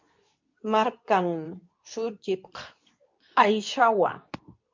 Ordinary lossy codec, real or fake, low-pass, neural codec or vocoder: MP3, 64 kbps; fake; 7.2 kHz; codec, 24 kHz, 0.9 kbps, WavTokenizer, medium speech release version 2